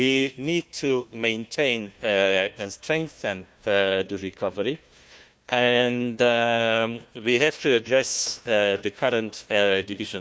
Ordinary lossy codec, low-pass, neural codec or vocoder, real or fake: none; none; codec, 16 kHz, 1 kbps, FunCodec, trained on Chinese and English, 50 frames a second; fake